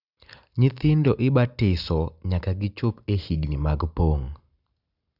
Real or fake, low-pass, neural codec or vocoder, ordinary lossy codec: real; 5.4 kHz; none; none